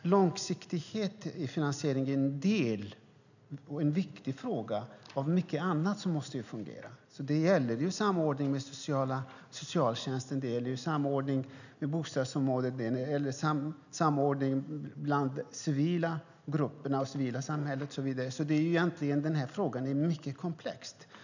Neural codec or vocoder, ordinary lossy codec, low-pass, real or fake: none; none; 7.2 kHz; real